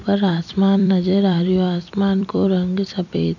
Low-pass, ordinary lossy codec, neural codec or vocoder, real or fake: 7.2 kHz; none; vocoder, 44.1 kHz, 128 mel bands every 256 samples, BigVGAN v2; fake